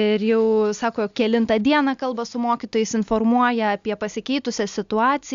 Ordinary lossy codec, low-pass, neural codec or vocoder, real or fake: Opus, 64 kbps; 7.2 kHz; none; real